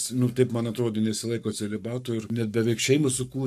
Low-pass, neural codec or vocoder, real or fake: 14.4 kHz; codec, 44.1 kHz, 7.8 kbps, Pupu-Codec; fake